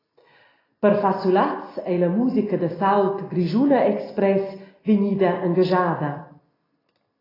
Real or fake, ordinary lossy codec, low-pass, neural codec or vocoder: real; AAC, 24 kbps; 5.4 kHz; none